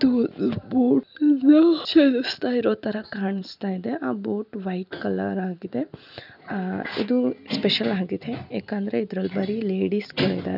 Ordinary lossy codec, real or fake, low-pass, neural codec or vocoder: none; real; 5.4 kHz; none